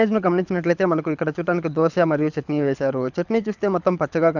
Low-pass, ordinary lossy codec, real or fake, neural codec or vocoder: 7.2 kHz; none; fake; codec, 24 kHz, 6 kbps, HILCodec